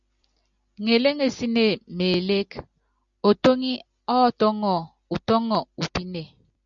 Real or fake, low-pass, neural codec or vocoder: real; 7.2 kHz; none